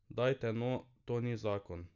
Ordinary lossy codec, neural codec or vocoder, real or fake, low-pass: none; none; real; 7.2 kHz